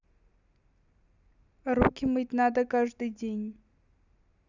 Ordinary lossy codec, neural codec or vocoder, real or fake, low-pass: none; none; real; 7.2 kHz